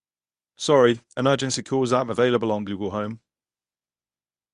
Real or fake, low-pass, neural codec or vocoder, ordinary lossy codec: fake; 10.8 kHz; codec, 24 kHz, 0.9 kbps, WavTokenizer, medium speech release version 1; Opus, 64 kbps